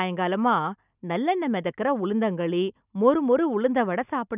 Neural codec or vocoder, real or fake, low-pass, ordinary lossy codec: none; real; 3.6 kHz; none